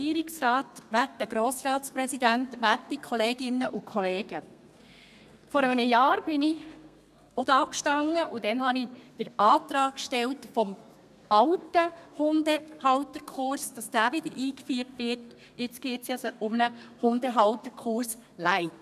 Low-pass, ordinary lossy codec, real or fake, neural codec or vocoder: 14.4 kHz; none; fake; codec, 32 kHz, 1.9 kbps, SNAC